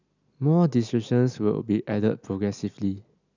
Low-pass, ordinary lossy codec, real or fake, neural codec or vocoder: 7.2 kHz; none; real; none